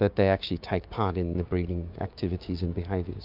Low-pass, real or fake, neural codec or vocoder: 5.4 kHz; fake; codec, 16 kHz, 6 kbps, DAC